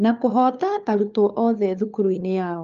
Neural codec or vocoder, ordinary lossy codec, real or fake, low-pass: codec, 16 kHz, 4 kbps, FunCodec, trained on LibriTTS, 50 frames a second; Opus, 24 kbps; fake; 7.2 kHz